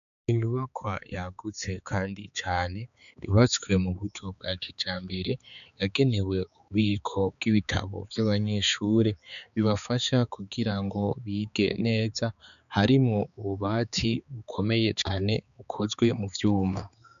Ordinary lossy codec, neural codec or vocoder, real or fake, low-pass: MP3, 96 kbps; codec, 16 kHz, 4 kbps, X-Codec, HuBERT features, trained on balanced general audio; fake; 7.2 kHz